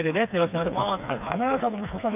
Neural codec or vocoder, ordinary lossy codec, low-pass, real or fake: codec, 16 kHz, 4 kbps, FreqCodec, smaller model; none; 3.6 kHz; fake